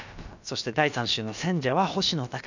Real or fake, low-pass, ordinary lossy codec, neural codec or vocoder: fake; 7.2 kHz; none; codec, 16 kHz, about 1 kbps, DyCAST, with the encoder's durations